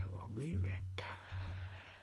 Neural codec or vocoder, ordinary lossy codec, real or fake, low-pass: codec, 24 kHz, 3 kbps, HILCodec; none; fake; none